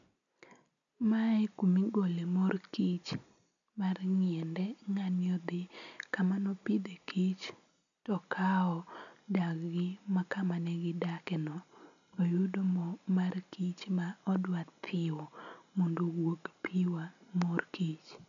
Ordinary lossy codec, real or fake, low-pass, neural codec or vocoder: none; real; 7.2 kHz; none